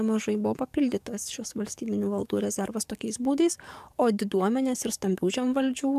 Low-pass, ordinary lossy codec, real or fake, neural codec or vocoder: 14.4 kHz; MP3, 96 kbps; fake; codec, 44.1 kHz, 7.8 kbps, DAC